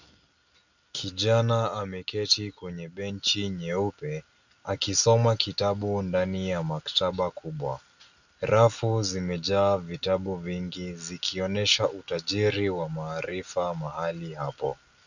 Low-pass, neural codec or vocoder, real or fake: 7.2 kHz; none; real